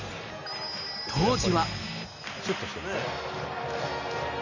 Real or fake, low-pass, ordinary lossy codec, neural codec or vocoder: real; 7.2 kHz; none; none